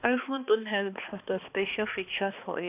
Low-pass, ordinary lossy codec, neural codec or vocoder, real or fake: 3.6 kHz; AAC, 32 kbps; codec, 16 kHz, 2 kbps, X-Codec, HuBERT features, trained on balanced general audio; fake